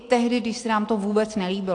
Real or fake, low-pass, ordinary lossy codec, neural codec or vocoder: real; 9.9 kHz; AAC, 48 kbps; none